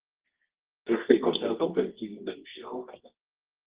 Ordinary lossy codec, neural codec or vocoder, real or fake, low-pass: Opus, 16 kbps; codec, 24 kHz, 0.9 kbps, WavTokenizer, medium music audio release; fake; 3.6 kHz